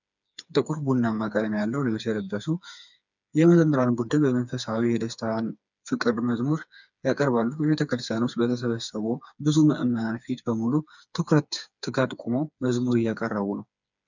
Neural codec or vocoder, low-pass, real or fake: codec, 16 kHz, 4 kbps, FreqCodec, smaller model; 7.2 kHz; fake